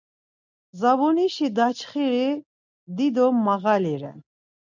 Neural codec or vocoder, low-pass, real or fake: none; 7.2 kHz; real